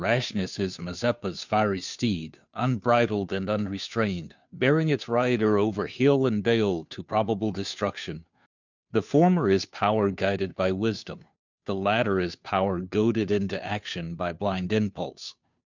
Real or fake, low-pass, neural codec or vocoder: fake; 7.2 kHz; codec, 16 kHz, 2 kbps, FunCodec, trained on Chinese and English, 25 frames a second